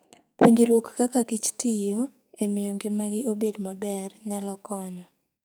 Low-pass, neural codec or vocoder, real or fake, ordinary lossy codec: none; codec, 44.1 kHz, 2.6 kbps, SNAC; fake; none